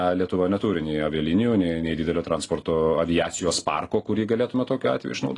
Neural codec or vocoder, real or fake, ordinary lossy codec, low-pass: none; real; AAC, 32 kbps; 10.8 kHz